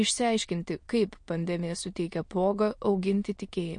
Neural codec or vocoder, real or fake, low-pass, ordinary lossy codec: autoencoder, 22.05 kHz, a latent of 192 numbers a frame, VITS, trained on many speakers; fake; 9.9 kHz; MP3, 48 kbps